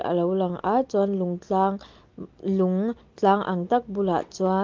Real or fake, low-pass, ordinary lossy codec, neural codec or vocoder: real; 7.2 kHz; Opus, 32 kbps; none